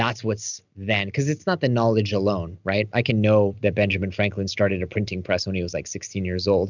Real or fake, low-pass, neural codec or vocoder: real; 7.2 kHz; none